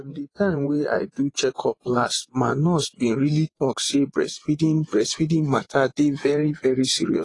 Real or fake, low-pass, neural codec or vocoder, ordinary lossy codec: fake; 10.8 kHz; vocoder, 24 kHz, 100 mel bands, Vocos; AAC, 32 kbps